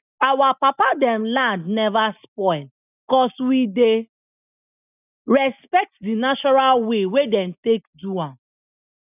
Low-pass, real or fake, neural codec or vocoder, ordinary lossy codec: 3.6 kHz; real; none; none